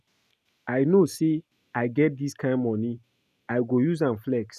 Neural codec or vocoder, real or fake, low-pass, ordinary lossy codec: none; real; 14.4 kHz; none